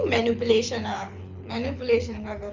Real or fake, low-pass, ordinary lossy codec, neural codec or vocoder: fake; 7.2 kHz; MP3, 64 kbps; codec, 24 kHz, 6 kbps, HILCodec